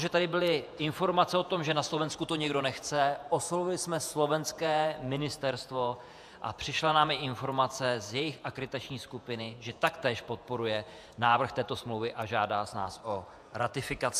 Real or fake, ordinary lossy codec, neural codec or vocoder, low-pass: fake; AAC, 96 kbps; vocoder, 48 kHz, 128 mel bands, Vocos; 14.4 kHz